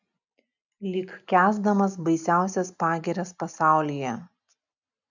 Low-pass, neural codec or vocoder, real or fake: 7.2 kHz; none; real